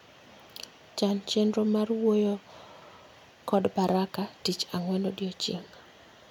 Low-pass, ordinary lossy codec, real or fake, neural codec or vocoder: 19.8 kHz; none; real; none